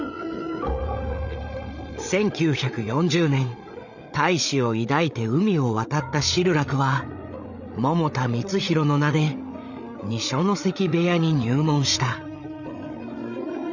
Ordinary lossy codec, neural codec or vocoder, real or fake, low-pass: none; codec, 16 kHz, 8 kbps, FreqCodec, larger model; fake; 7.2 kHz